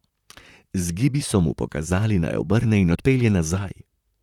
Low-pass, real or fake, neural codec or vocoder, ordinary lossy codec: 19.8 kHz; fake; vocoder, 44.1 kHz, 128 mel bands, Pupu-Vocoder; none